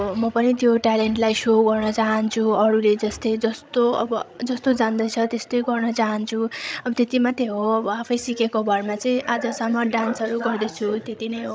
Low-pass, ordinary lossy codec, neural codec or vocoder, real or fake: none; none; codec, 16 kHz, 16 kbps, FreqCodec, larger model; fake